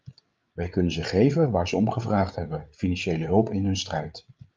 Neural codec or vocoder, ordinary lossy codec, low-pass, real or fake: codec, 16 kHz, 8 kbps, FreqCodec, larger model; Opus, 24 kbps; 7.2 kHz; fake